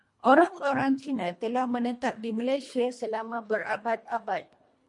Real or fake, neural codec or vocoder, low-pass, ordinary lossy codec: fake; codec, 24 kHz, 1.5 kbps, HILCodec; 10.8 kHz; MP3, 48 kbps